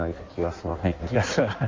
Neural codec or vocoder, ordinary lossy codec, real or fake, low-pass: codec, 16 kHz in and 24 kHz out, 1.1 kbps, FireRedTTS-2 codec; Opus, 32 kbps; fake; 7.2 kHz